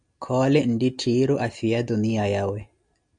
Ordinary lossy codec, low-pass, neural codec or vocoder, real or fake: MP3, 96 kbps; 9.9 kHz; none; real